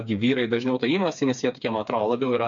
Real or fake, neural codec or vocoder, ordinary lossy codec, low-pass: fake; codec, 16 kHz, 4 kbps, FreqCodec, smaller model; MP3, 64 kbps; 7.2 kHz